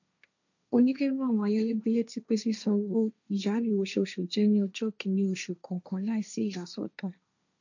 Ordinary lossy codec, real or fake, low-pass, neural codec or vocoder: none; fake; 7.2 kHz; codec, 16 kHz, 1.1 kbps, Voila-Tokenizer